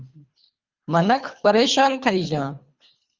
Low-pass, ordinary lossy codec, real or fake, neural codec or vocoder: 7.2 kHz; Opus, 32 kbps; fake; codec, 24 kHz, 3 kbps, HILCodec